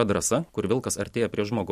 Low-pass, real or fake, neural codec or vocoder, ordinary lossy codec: 14.4 kHz; real; none; MP3, 64 kbps